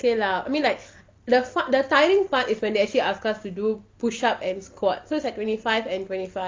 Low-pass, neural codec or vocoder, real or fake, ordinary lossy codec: 7.2 kHz; none; real; Opus, 24 kbps